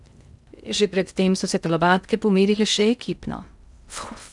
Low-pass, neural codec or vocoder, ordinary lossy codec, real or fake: 10.8 kHz; codec, 16 kHz in and 24 kHz out, 0.6 kbps, FocalCodec, streaming, 2048 codes; none; fake